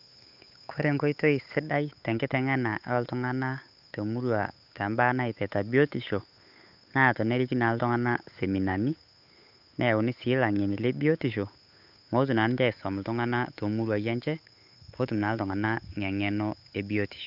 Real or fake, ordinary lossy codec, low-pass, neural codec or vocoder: fake; none; 5.4 kHz; codec, 16 kHz, 8 kbps, FunCodec, trained on Chinese and English, 25 frames a second